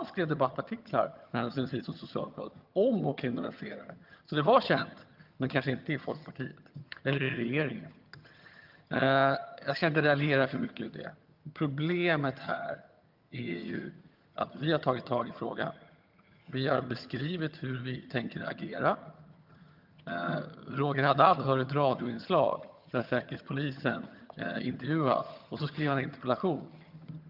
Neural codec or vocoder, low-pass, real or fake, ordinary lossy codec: vocoder, 22.05 kHz, 80 mel bands, HiFi-GAN; 5.4 kHz; fake; Opus, 24 kbps